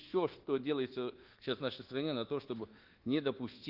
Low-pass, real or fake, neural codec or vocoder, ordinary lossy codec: 5.4 kHz; fake; codec, 24 kHz, 1.2 kbps, DualCodec; Opus, 16 kbps